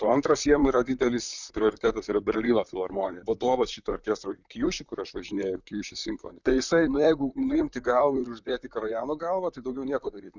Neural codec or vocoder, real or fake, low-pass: vocoder, 44.1 kHz, 128 mel bands, Pupu-Vocoder; fake; 7.2 kHz